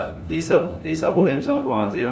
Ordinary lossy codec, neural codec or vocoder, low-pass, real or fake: none; codec, 16 kHz, 1 kbps, FunCodec, trained on LibriTTS, 50 frames a second; none; fake